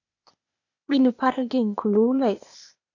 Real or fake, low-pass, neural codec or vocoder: fake; 7.2 kHz; codec, 16 kHz, 0.8 kbps, ZipCodec